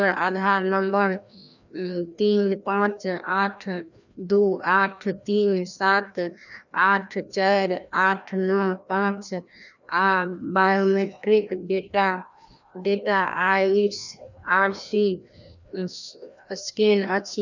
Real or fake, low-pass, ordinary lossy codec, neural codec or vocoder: fake; 7.2 kHz; none; codec, 16 kHz, 1 kbps, FreqCodec, larger model